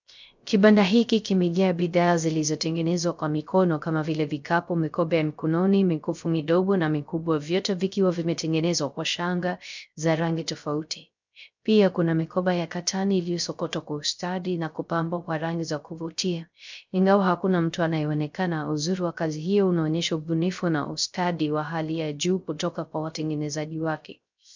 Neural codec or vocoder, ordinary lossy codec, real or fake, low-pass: codec, 16 kHz, 0.3 kbps, FocalCodec; MP3, 64 kbps; fake; 7.2 kHz